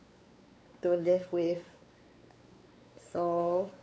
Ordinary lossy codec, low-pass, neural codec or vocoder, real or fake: none; none; codec, 16 kHz, 4 kbps, X-Codec, WavLM features, trained on Multilingual LibriSpeech; fake